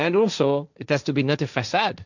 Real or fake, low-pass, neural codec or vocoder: fake; 7.2 kHz; codec, 16 kHz, 1.1 kbps, Voila-Tokenizer